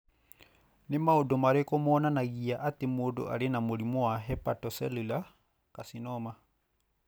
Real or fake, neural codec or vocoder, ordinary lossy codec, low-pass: real; none; none; none